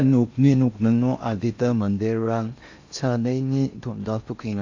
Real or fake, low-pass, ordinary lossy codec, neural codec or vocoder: fake; 7.2 kHz; AAC, 48 kbps; codec, 16 kHz in and 24 kHz out, 0.6 kbps, FocalCodec, streaming, 4096 codes